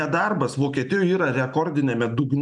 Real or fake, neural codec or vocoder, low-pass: real; none; 10.8 kHz